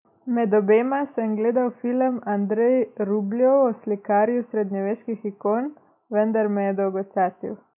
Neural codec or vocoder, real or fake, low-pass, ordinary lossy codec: none; real; 3.6 kHz; none